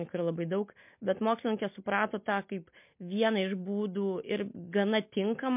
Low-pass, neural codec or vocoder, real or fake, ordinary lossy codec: 3.6 kHz; none; real; MP3, 32 kbps